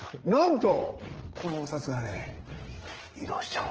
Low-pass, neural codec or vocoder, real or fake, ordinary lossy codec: 7.2 kHz; codec, 16 kHz, 8 kbps, FreqCodec, larger model; fake; Opus, 16 kbps